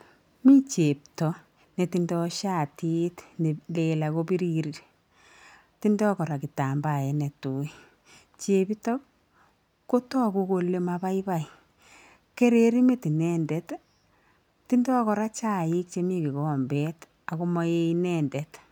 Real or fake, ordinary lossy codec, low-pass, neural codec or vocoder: real; none; none; none